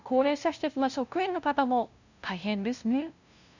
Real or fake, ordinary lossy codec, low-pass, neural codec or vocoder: fake; none; 7.2 kHz; codec, 16 kHz, 0.5 kbps, FunCodec, trained on LibriTTS, 25 frames a second